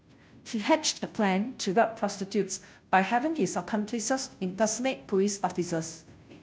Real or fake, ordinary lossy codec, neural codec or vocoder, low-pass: fake; none; codec, 16 kHz, 0.5 kbps, FunCodec, trained on Chinese and English, 25 frames a second; none